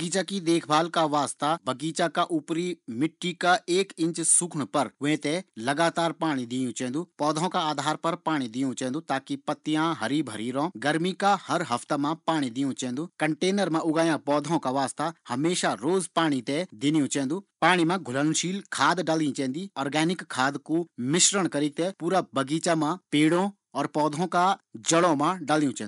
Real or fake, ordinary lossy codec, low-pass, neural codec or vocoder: real; none; 10.8 kHz; none